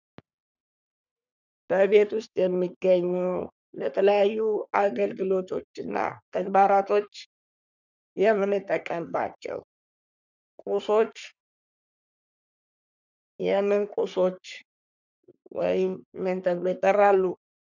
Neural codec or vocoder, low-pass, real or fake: codec, 44.1 kHz, 3.4 kbps, Pupu-Codec; 7.2 kHz; fake